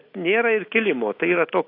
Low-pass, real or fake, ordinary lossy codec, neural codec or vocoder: 5.4 kHz; real; AAC, 32 kbps; none